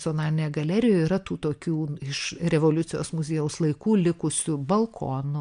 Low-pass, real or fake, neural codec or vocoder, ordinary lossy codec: 9.9 kHz; real; none; MP3, 64 kbps